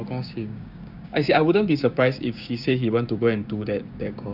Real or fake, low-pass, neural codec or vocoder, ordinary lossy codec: fake; 5.4 kHz; codec, 16 kHz, 6 kbps, DAC; none